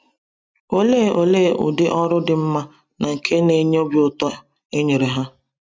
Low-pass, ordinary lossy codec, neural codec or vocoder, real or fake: none; none; none; real